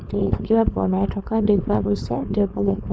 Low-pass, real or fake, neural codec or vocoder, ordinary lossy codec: none; fake; codec, 16 kHz, 4.8 kbps, FACodec; none